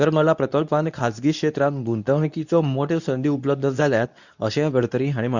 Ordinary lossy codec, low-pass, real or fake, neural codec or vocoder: none; 7.2 kHz; fake; codec, 24 kHz, 0.9 kbps, WavTokenizer, medium speech release version 2